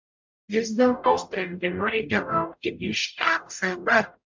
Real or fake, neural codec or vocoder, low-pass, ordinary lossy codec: fake; codec, 44.1 kHz, 0.9 kbps, DAC; 7.2 kHz; MP3, 64 kbps